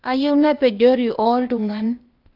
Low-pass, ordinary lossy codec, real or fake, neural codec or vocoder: 5.4 kHz; Opus, 24 kbps; fake; codec, 16 kHz, 0.8 kbps, ZipCodec